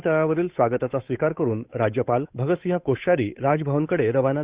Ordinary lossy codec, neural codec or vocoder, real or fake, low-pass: Opus, 64 kbps; codec, 16 kHz, 8 kbps, FunCodec, trained on Chinese and English, 25 frames a second; fake; 3.6 kHz